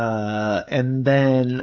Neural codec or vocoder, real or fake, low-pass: codec, 16 kHz, 16 kbps, FreqCodec, smaller model; fake; 7.2 kHz